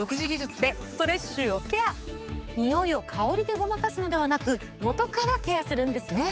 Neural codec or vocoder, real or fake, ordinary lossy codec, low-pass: codec, 16 kHz, 4 kbps, X-Codec, HuBERT features, trained on general audio; fake; none; none